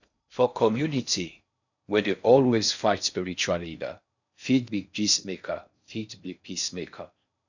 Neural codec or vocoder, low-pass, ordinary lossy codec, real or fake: codec, 16 kHz in and 24 kHz out, 0.6 kbps, FocalCodec, streaming, 4096 codes; 7.2 kHz; none; fake